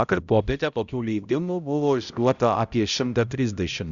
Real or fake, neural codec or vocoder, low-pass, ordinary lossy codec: fake; codec, 16 kHz, 0.5 kbps, X-Codec, HuBERT features, trained on balanced general audio; 7.2 kHz; Opus, 64 kbps